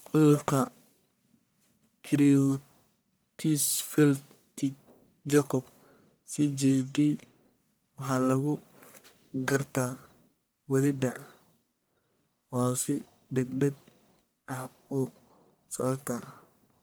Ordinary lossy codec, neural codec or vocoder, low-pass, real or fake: none; codec, 44.1 kHz, 1.7 kbps, Pupu-Codec; none; fake